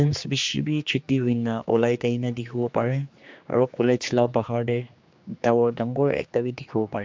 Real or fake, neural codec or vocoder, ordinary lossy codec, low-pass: fake; codec, 16 kHz, 2 kbps, X-Codec, HuBERT features, trained on general audio; MP3, 64 kbps; 7.2 kHz